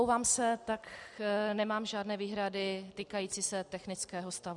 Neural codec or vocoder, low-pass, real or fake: none; 10.8 kHz; real